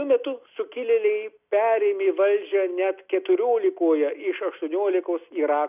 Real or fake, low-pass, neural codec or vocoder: real; 3.6 kHz; none